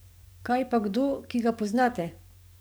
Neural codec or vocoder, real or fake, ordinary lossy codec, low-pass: codec, 44.1 kHz, 7.8 kbps, DAC; fake; none; none